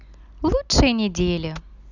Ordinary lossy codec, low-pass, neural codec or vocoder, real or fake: none; 7.2 kHz; none; real